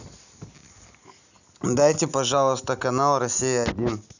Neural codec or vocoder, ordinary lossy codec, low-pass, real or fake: none; none; 7.2 kHz; real